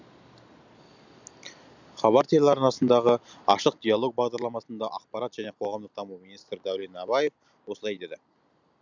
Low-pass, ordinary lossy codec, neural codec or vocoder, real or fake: 7.2 kHz; none; none; real